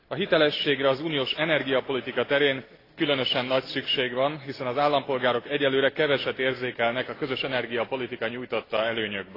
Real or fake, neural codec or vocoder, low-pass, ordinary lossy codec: real; none; 5.4 kHz; AAC, 24 kbps